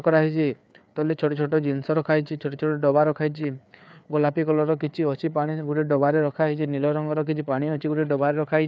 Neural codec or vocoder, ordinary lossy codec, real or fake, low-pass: codec, 16 kHz, 4 kbps, FreqCodec, larger model; none; fake; none